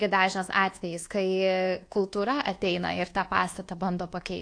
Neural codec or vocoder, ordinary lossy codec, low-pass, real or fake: codec, 24 kHz, 1.2 kbps, DualCodec; AAC, 48 kbps; 9.9 kHz; fake